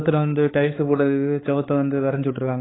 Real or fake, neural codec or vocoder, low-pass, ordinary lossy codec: fake; codec, 16 kHz, 4 kbps, X-Codec, HuBERT features, trained on balanced general audio; 7.2 kHz; AAC, 16 kbps